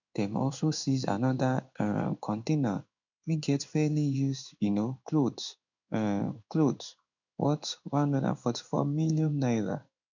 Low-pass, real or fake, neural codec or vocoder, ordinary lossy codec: 7.2 kHz; fake; codec, 16 kHz in and 24 kHz out, 1 kbps, XY-Tokenizer; none